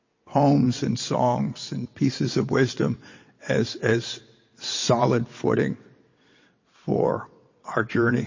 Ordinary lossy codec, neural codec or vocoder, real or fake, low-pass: MP3, 32 kbps; vocoder, 22.05 kHz, 80 mel bands, WaveNeXt; fake; 7.2 kHz